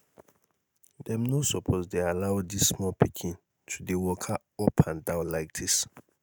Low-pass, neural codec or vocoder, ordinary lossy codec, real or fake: none; none; none; real